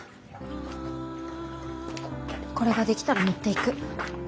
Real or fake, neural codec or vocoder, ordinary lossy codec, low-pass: real; none; none; none